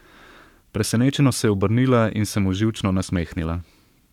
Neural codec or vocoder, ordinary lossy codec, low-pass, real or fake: codec, 44.1 kHz, 7.8 kbps, Pupu-Codec; none; 19.8 kHz; fake